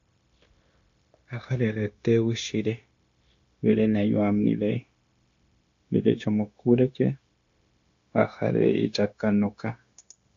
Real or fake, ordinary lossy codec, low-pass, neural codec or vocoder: fake; AAC, 48 kbps; 7.2 kHz; codec, 16 kHz, 0.9 kbps, LongCat-Audio-Codec